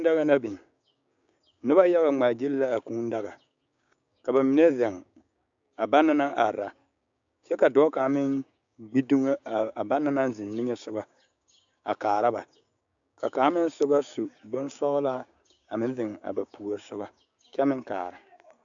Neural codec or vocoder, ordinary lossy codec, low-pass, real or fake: codec, 16 kHz, 6 kbps, DAC; AAC, 64 kbps; 7.2 kHz; fake